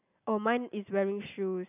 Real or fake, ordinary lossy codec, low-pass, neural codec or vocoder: real; none; 3.6 kHz; none